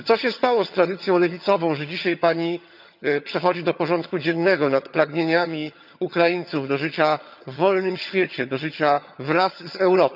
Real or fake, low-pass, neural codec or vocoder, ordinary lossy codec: fake; 5.4 kHz; vocoder, 22.05 kHz, 80 mel bands, HiFi-GAN; none